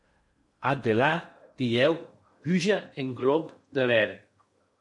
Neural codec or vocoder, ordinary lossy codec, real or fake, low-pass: codec, 16 kHz in and 24 kHz out, 0.8 kbps, FocalCodec, streaming, 65536 codes; MP3, 48 kbps; fake; 10.8 kHz